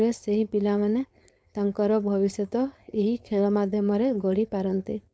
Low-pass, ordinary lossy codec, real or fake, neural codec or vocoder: none; none; fake; codec, 16 kHz, 4.8 kbps, FACodec